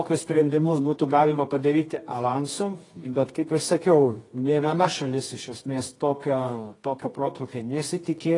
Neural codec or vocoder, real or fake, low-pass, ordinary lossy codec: codec, 24 kHz, 0.9 kbps, WavTokenizer, medium music audio release; fake; 10.8 kHz; AAC, 32 kbps